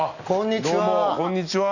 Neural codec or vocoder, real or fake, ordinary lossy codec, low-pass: none; real; none; 7.2 kHz